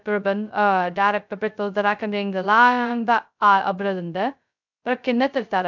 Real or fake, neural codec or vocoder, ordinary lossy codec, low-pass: fake; codec, 16 kHz, 0.2 kbps, FocalCodec; none; 7.2 kHz